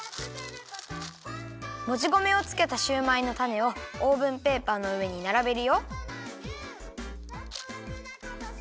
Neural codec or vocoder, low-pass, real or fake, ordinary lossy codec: none; none; real; none